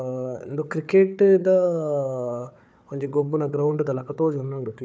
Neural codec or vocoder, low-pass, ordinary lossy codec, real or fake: codec, 16 kHz, 4 kbps, FunCodec, trained on Chinese and English, 50 frames a second; none; none; fake